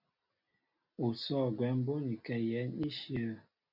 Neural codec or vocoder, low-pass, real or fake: none; 5.4 kHz; real